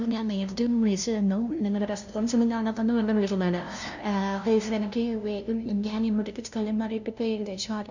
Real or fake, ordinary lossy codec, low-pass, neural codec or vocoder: fake; none; 7.2 kHz; codec, 16 kHz, 0.5 kbps, FunCodec, trained on LibriTTS, 25 frames a second